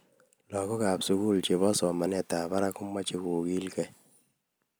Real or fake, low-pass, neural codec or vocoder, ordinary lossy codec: real; none; none; none